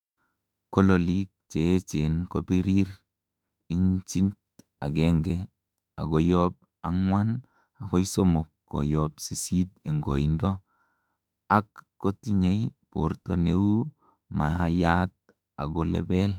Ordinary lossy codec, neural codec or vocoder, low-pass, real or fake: none; autoencoder, 48 kHz, 32 numbers a frame, DAC-VAE, trained on Japanese speech; 19.8 kHz; fake